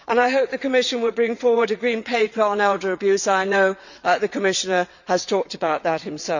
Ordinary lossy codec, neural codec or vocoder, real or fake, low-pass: none; vocoder, 22.05 kHz, 80 mel bands, WaveNeXt; fake; 7.2 kHz